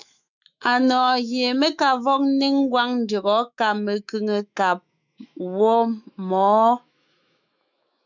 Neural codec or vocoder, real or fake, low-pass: autoencoder, 48 kHz, 128 numbers a frame, DAC-VAE, trained on Japanese speech; fake; 7.2 kHz